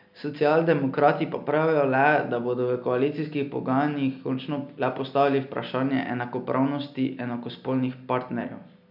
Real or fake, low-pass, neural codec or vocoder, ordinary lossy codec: real; 5.4 kHz; none; none